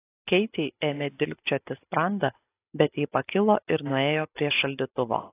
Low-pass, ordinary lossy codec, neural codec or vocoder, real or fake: 3.6 kHz; AAC, 24 kbps; none; real